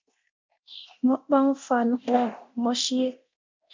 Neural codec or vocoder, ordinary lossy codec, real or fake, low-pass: codec, 24 kHz, 0.9 kbps, DualCodec; MP3, 64 kbps; fake; 7.2 kHz